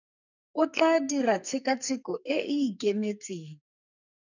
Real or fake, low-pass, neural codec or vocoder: fake; 7.2 kHz; codec, 44.1 kHz, 2.6 kbps, SNAC